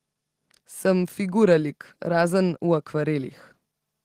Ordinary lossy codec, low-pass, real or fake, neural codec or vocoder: Opus, 16 kbps; 14.4 kHz; real; none